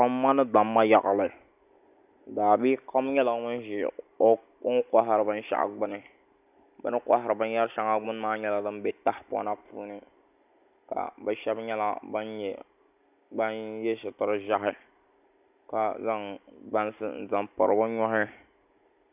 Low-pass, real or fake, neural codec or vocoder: 3.6 kHz; real; none